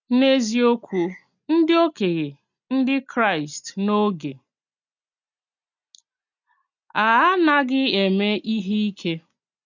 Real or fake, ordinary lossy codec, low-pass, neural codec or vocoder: real; none; 7.2 kHz; none